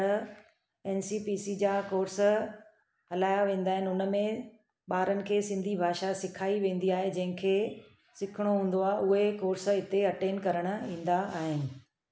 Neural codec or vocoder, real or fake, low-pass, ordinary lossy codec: none; real; none; none